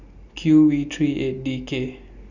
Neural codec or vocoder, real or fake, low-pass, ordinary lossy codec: none; real; 7.2 kHz; none